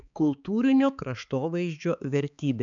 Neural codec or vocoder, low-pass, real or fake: codec, 16 kHz, 4 kbps, X-Codec, HuBERT features, trained on balanced general audio; 7.2 kHz; fake